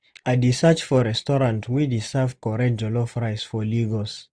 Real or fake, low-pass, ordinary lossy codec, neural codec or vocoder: real; 9.9 kHz; none; none